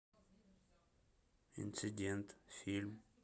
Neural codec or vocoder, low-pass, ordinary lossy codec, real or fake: none; none; none; real